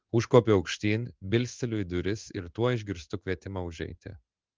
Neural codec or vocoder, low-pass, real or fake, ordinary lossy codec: codec, 16 kHz in and 24 kHz out, 1 kbps, XY-Tokenizer; 7.2 kHz; fake; Opus, 32 kbps